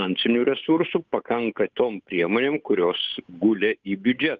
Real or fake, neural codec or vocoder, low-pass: fake; codec, 16 kHz, 8 kbps, FunCodec, trained on Chinese and English, 25 frames a second; 7.2 kHz